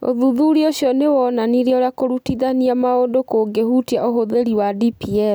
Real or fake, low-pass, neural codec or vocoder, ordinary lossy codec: real; none; none; none